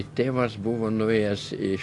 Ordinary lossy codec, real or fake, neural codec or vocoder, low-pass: AAC, 48 kbps; real; none; 10.8 kHz